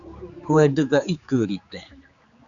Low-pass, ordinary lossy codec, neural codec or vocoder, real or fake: 7.2 kHz; Opus, 64 kbps; codec, 16 kHz, 4 kbps, X-Codec, HuBERT features, trained on general audio; fake